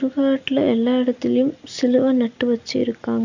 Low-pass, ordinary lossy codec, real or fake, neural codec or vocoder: 7.2 kHz; none; real; none